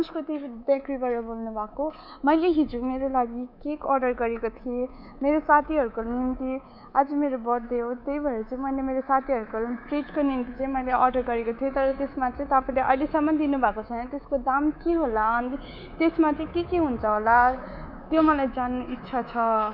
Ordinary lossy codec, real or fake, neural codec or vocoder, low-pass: none; fake; codec, 24 kHz, 3.1 kbps, DualCodec; 5.4 kHz